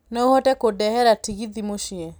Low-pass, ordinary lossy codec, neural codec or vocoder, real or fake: none; none; none; real